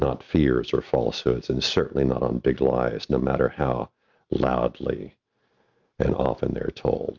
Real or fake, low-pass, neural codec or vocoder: real; 7.2 kHz; none